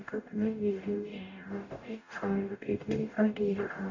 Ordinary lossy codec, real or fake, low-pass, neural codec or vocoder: none; fake; 7.2 kHz; codec, 44.1 kHz, 0.9 kbps, DAC